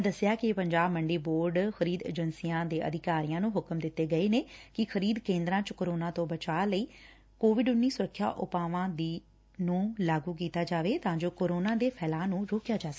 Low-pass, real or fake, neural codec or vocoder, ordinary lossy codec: none; real; none; none